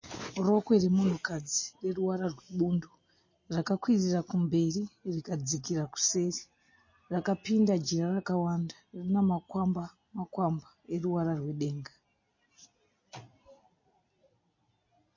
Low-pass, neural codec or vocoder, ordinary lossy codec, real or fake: 7.2 kHz; none; MP3, 32 kbps; real